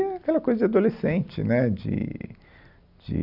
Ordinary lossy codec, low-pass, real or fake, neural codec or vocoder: none; 5.4 kHz; real; none